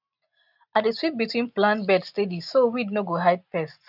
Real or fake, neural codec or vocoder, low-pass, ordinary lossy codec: real; none; 5.4 kHz; none